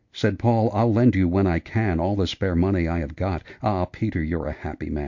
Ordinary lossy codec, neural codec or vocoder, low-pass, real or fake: MP3, 48 kbps; none; 7.2 kHz; real